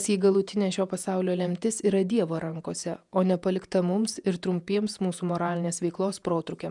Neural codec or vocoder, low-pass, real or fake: vocoder, 48 kHz, 128 mel bands, Vocos; 10.8 kHz; fake